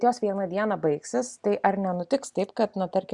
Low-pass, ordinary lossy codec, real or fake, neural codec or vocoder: 10.8 kHz; Opus, 64 kbps; real; none